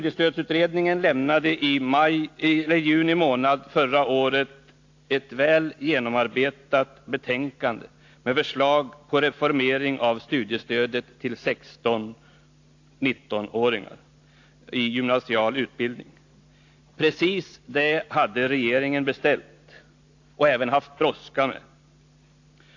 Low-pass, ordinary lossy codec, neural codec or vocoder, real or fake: 7.2 kHz; AAC, 48 kbps; none; real